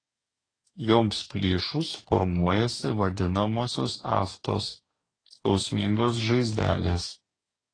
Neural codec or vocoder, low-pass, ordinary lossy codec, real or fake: codec, 44.1 kHz, 2.6 kbps, DAC; 9.9 kHz; AAC, 32 kbps; fake